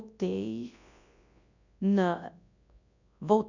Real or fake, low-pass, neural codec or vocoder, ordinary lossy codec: fake; 7.2 kHz; codec, 24 kHz, 0.9 kbps, WavTokenizer, large speech release; none